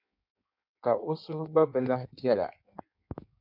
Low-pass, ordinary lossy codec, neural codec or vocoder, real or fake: 5.4 kHz; MP3, 48 kbps; codec, 16 kHz in and 24 kHz out, 1.1 kbps, FireRedTTS-2 codec; fake